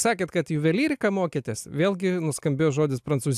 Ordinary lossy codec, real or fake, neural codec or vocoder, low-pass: AAC, 96 kbps; real; none; 14.4 kHz